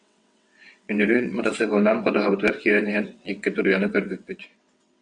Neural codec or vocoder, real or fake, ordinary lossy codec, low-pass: vocoder, 22.05 kHz, 80 mel bands, WaveNeXt; fake; MP3, 96 kbps; 9.9 kHz